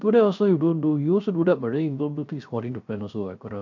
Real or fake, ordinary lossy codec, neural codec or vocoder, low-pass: fake; none; codec, 16 kHz, 0.3 kbps, FocalCodec; 7.2 kHz